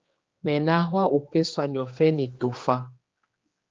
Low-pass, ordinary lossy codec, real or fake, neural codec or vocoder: 7.2 kHz; Opus, 24 kbps; fake; codec, 16 kHz, 2 kbps, X-Codec, HuBERT features, trained on general audio